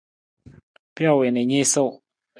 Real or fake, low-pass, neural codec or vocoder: real; 9.9 kHz; none